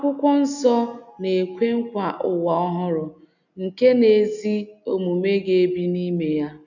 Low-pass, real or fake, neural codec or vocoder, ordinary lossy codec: 7.2 kHz; real; none; none